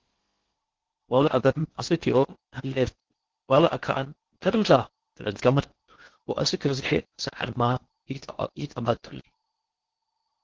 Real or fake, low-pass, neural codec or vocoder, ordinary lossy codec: fake; 7.2 kHz; codec, 16 kHz in and 24 kHz out, 0.6 kbps, FocalCodec, streaming, 4096 codes; Opus, 16 kbps